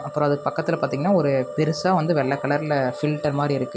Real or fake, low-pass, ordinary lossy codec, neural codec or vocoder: real; none; none; none